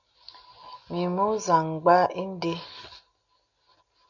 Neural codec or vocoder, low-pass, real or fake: none; 7.2 kHz; real